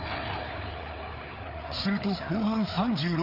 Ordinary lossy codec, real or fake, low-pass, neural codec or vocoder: none; fake; 5.4 kHz; codec, 16 kHz, 4 kbps, FreqCodec, larger model